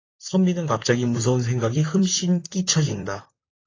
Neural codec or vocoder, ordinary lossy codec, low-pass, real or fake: vocoder, 44.1 kHz, 128 mel bands, Pupu-Vocoder; AAC, 32 kbps; 7.2 kHz; fake